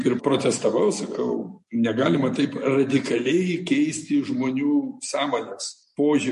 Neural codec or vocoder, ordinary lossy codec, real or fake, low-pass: none; MP3, 48 kbps; real; 14.4 kHz